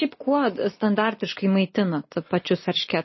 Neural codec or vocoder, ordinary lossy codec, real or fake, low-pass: none; MP3, 24 kbps; real; 7.2 kHz